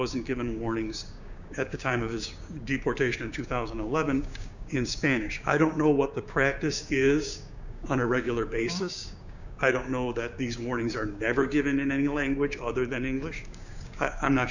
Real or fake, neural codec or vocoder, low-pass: fake; codec, 16 kHz, 6 kbps, DAC; 7.2 kHz